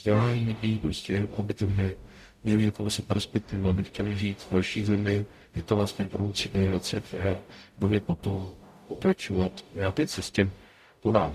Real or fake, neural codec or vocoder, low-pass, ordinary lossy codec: fake; codec, 44.1 kHz, 0.9 kbps, DAC; 14.4 kHz; Opus, 64 kbps